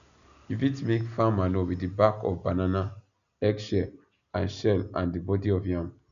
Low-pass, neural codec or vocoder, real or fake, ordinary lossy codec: 7.2 kHz; none; real; none